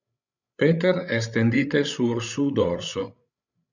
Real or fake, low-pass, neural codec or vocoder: fake; 7.2 kHz; codec, 16 kHz, 16 kbps, FreqCodec, larger model